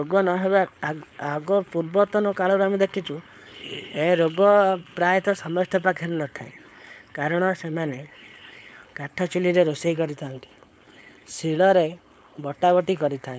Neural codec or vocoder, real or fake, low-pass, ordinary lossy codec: codec, 16 kHz, 4.8 kbps, FACodec; fake; none; none